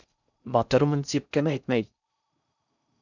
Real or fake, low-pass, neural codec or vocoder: fake; 7.2 kHz; codec, 16 kHz in and 24 kHz out, 0.6 kbps, FocalCodec, streaming, 4096 codes